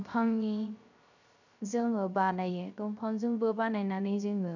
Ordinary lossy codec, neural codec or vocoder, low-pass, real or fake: none; codec, 16 kHz, 0.3 kbps, FocalCodec; 7.2 kHz; fake